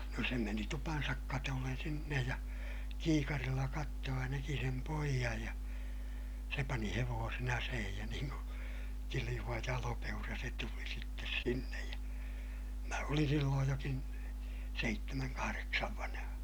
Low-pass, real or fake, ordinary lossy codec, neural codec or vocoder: none; real; none; none